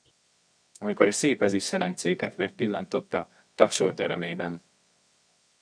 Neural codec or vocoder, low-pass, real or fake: codec, 24 kHz, 0.9 kbps, WavTokenizer, medium music audio release; 9.9 kHz; fake